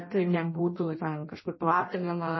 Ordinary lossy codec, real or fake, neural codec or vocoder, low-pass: MP3, 24 kbps; fake; codec, 16 kHz in and 24 kHz out, 0.6 kbps, FireRedTTS-2 codec; 7.2 kHz